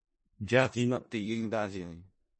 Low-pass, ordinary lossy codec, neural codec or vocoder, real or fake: 9.9 kHz; MP3, 32 kbps; codec, 16 kHz in and 24 kHz out, 0.4 kbps, LongCat-Audio-Codec, four codebook decoder; fake